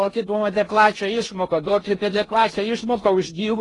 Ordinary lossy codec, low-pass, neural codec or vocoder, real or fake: AAC, 32 kbps; 10.8 kHz; codec, 16 kHz in and 24 kHz out, 0.6 kbps, FocalCodec, streaming, 4096 codes; fake